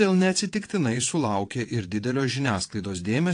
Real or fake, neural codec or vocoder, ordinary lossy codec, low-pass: fake; vocoder, 22.05 kHz, 80 mel bands, WaveNeXt; AAC, 48 kbps; 9.9 kHz